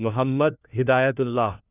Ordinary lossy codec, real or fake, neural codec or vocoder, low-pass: none; fake; codec, 16 kHz, 1 kbps, FunCodec, trained on LibriTTS, 50 frames a second; 3.6 kHz